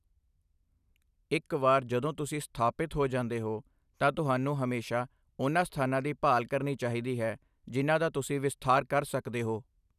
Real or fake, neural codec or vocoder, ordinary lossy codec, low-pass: real; none; none; 14.4 kHz